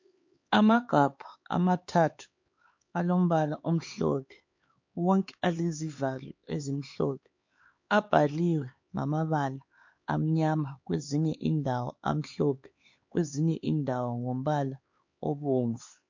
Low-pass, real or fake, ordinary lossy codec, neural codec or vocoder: 7.2 kHz; fake; MP3, 48 kbps; codec, 16 kHz, 4 kbps, X-Codec, HuBERT features, trained on LibriSpeech